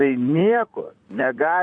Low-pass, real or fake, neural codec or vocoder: 9.9 kHz; fake; vocoder, 44.1 kHz, 128 mel bands, Pupu-Vocoder